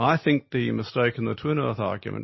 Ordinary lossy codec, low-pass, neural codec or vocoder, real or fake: MP3, 24 kbps; 7.2 kHz; none; real